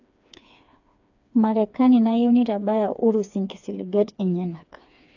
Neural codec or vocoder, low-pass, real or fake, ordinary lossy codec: codec, 16 kHz, 4 kbps, FreqCodec, smaller model; 7.2 kHz; fake; AAC, 48 kbps